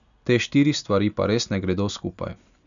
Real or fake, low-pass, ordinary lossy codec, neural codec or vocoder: real; 7.2 kHz; none; none